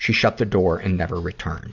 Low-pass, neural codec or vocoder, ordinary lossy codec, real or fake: 7.2 kHz; none; Opus, 64 kbps; real